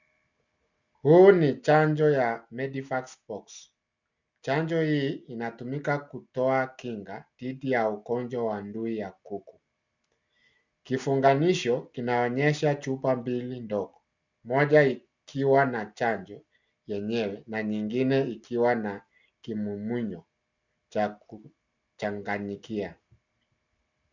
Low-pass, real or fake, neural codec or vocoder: 7.2 kHz; real; none